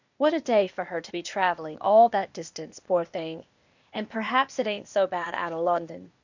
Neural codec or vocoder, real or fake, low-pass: codec, 16 kHz, 0.8 kbps, ZipCodec; fake; 7.2 kHz